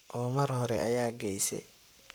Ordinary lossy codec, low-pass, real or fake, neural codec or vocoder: none; none; fake; codec, 44.1 kHz, 7.8 kbps, Pupu-Codec